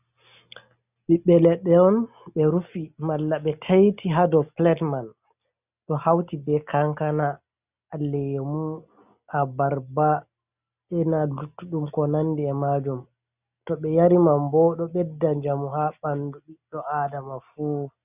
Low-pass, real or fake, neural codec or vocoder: 3.6 kHz; real; none